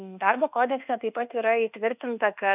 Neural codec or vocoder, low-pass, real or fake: codec, 24 kHz, 1.2 kbps, DualCodec; 3.6 kHz; fake